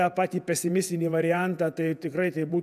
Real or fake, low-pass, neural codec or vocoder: fake; 14.4 kHz; vocoder, 48 kHz, 128 mel bands, Vocos